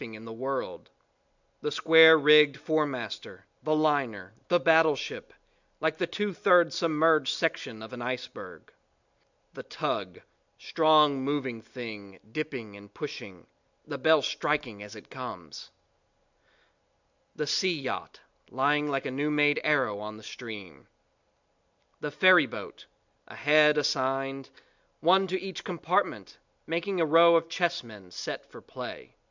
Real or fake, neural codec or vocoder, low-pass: real; none; 7.2 kHz